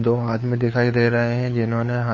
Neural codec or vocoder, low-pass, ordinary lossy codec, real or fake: codec, 16 kHz, 4 kbps, FunCodec, trained on LibriTTS, 50 frames a second; 7.2 kHz; MP3, 32 kbps; fake